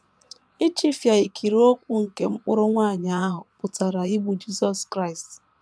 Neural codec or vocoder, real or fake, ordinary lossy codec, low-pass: vocoder, 22.05 kHz, 80 mel bands, Vocos; fake; none; none